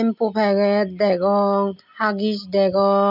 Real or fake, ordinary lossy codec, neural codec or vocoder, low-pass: real; none; none; 5.4 kHz